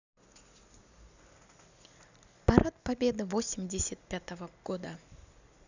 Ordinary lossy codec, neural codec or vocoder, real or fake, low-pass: none; none; real; 7.2 kHz